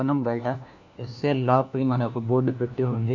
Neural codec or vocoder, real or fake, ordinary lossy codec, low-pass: codec, 16 kHz, 1 kbps, FunCodec, trained on LibriTTS, 50 frames a second; fake; none; 7.2 kHz